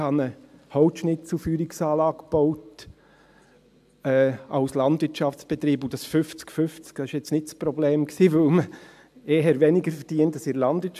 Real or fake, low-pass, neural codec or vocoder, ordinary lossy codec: real; 14.4 kHz; none; AAC, 96 kbps